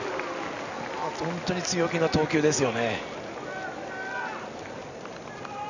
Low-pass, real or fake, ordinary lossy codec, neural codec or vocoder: 7.2 kHz; real; none; none